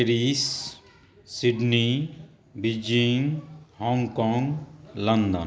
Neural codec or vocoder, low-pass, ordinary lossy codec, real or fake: none; none; none; real